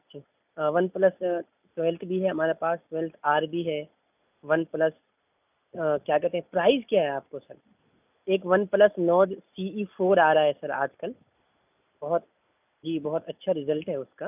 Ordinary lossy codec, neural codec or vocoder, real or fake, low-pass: none; none; real; 3.6 kHz